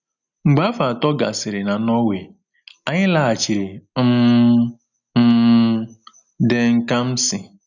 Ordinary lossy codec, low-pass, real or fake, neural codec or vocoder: none; 7.2 kHz; real; none